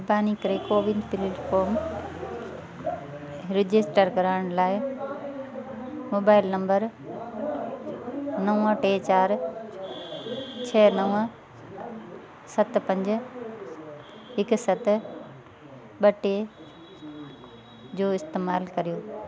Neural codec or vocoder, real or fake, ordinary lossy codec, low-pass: none; real; none; none